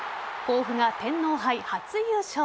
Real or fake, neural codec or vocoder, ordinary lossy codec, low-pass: real; none; none; none